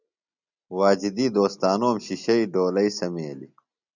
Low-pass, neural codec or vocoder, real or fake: 7.2 kHz; none; real